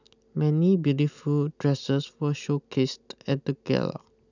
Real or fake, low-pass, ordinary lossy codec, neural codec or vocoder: real; 7.2 kHz; none; none